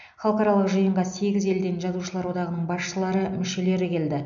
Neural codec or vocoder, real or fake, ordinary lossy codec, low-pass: none; real; none; 7.2 kHz